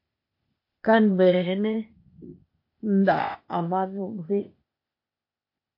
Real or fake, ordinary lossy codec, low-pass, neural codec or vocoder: fake; MP3, 32 kbps; 5.4 kHz; codec, 16 kHz, 0.8 kbps, ZipCodec